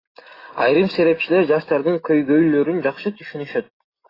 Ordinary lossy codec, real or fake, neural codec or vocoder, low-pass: AAC, 24 kbps; real; none; 5.4 kHz